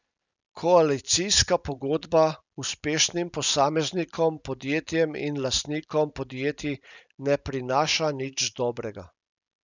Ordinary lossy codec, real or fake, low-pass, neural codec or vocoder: none; real; 7.2 kHz; none